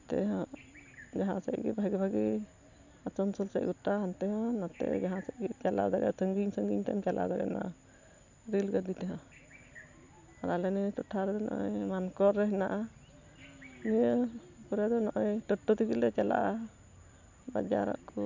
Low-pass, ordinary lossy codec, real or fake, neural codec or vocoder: 7.2 kHz; none; real; none